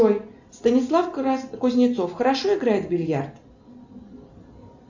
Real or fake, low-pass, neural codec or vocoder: real; 7.2 kHz; none